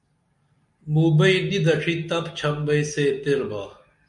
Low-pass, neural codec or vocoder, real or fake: 10.8 kHz; none; real